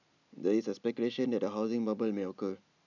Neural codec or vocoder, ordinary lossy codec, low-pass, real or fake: none; none; 7.2 kHz; real